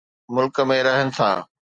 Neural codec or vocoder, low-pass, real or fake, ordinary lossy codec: none; 9.9 kHz; real; Opus, 64 kbps